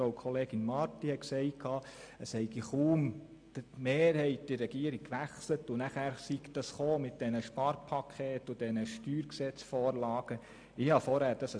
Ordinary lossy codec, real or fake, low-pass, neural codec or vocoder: MP3, 64 kbps; real; 9.9 kHz; none